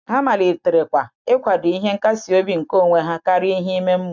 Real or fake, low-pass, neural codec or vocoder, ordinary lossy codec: real; 7.2 kHz; none; none